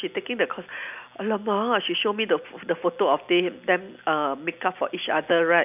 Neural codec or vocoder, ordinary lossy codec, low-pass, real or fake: none; none; 3.6 kHz; real